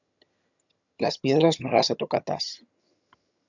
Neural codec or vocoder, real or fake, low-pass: vocoder, 22.05 kHz, 80 mel bands, HiFi-GAN; fake; 7.2 kHz